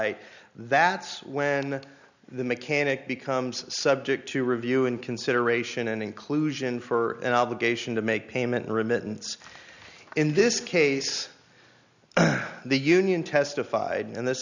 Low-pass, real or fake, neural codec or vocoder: 7.2 kHz; real; none